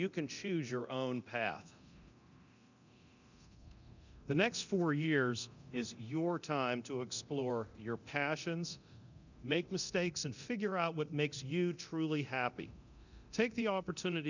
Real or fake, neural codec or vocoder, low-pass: fake; codec, 24 kHz, 0.9 kbps, DualCodec; 7.2 kHz